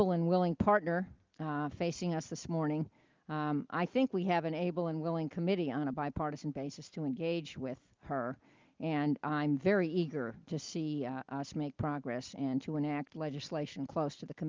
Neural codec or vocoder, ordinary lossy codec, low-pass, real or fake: none; Opus, 32 kbps; 7.2 kHz; real